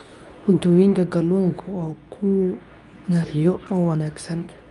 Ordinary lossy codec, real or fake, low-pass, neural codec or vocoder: none; fake; 10.8 kHz; codec, 24 kHz, 0.9 kbps, WavTokenizer, medium speech release version 2